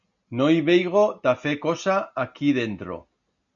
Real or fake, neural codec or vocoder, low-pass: real; none; 7.2 kHz